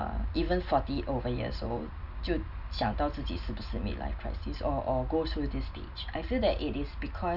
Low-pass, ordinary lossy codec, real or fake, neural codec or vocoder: 5.4 kHz; none; real; none